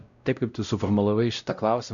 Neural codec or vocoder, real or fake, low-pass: codec, 16 kHz, 0.5 kbps, X-Codec, WavLM features, trained on Multilingual LibriSpeech; fake; 7.2 kHz